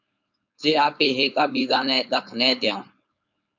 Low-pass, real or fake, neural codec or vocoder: 7.2 kHz; fake; codec, 16 kHz, 4.8 kbps, FACodec